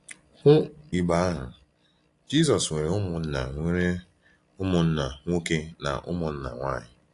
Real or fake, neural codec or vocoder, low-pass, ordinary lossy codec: real; none; 10.8 kHz; AAC, 48 kbps